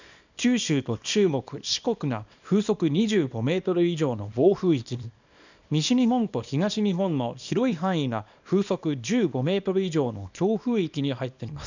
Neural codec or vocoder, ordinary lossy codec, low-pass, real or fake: codec, 24 kHz, 0.9 kbps, WavTokenizer, small release; none; 7.2 kHz; fake